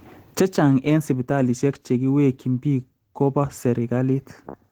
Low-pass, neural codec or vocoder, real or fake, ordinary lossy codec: 19.8 kHz; none; real; Opus, 16 kbps